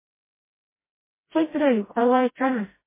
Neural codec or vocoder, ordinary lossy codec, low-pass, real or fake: codec, 16 kHz, 0.5 kbps, FreqCodec, smaller model; MP3, 16 kbps; 3.6 kHz; fake